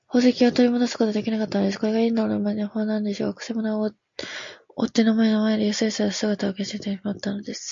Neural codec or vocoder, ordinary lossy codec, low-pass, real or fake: none; MP3, 48 kbps; 7.2 kHz; real